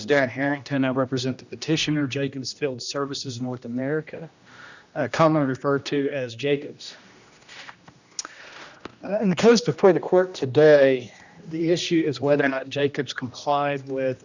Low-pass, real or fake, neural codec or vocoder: 7.2 kHz; fake; codec, 16 kHz, 1 kbps, X-Codec, HuBERT features, trained on general audio